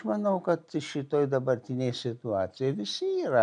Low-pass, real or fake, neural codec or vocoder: 9.9 kHz; real; none